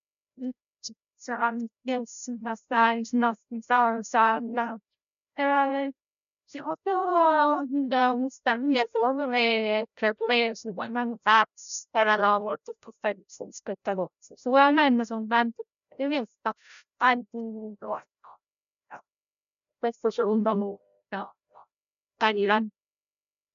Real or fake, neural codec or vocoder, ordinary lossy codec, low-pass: fake; codec, 16 kHz, 0.5 kbps, FreqCodec, larger model; none; 7.2 kHz